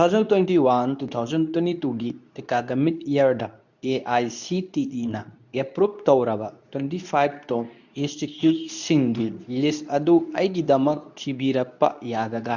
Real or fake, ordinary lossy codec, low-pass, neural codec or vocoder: fake; none; 7.2 kHz; codec, 24 kHz, 0.9 kbps, WavTokenizer, medium speech release version 2